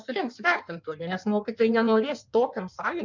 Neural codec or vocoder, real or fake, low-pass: codec, 16 kHz in and 24 kHz out, 1.1 kbps, FireRedTTS-2 codec; fake; 7.2 kHz